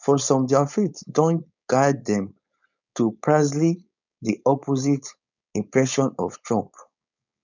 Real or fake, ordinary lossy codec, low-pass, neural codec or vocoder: fake; none; 7.2 kHz; codec, 16 kHz, 4.8 kbps, FACodec